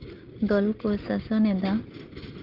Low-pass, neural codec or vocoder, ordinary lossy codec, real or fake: 5.4 kHz; none; Opus, 16 kbps; real